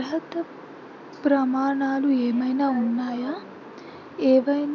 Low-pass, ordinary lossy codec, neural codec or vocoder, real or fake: 7.2 kHz; none; none; real